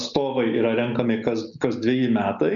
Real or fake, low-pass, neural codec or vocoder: real; 7.2 kHz; none